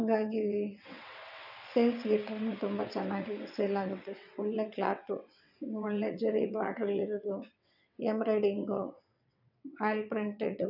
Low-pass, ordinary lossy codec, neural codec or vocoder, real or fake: 5.4 kHz; none; vocoder, 22.05 kHz, 80 mel bands, WaveNeXt; fake